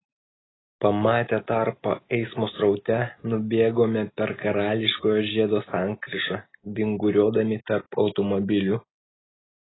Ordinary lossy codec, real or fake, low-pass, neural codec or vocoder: AAC, 16 kbps; real; 7.2 kHz; none